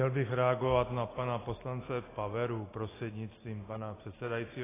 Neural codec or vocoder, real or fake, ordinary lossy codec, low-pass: none; real; AAC, 16 kbps; 3.6 kHz